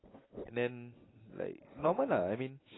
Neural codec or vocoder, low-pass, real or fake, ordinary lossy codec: none; 7.2 kHz; real; AAC, 16 kbps